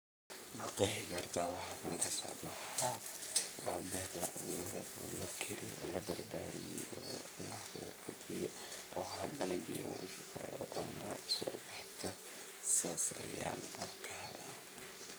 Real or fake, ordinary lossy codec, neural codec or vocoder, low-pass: fake; none; codec, 44.1 kHz, 3.4 kbps, Pupu-Codec; none